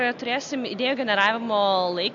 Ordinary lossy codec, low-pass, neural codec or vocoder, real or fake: MP3, 48 kbps; 7.2 kHz; none; real